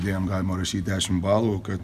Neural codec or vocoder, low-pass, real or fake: none; 14.4 kHz; real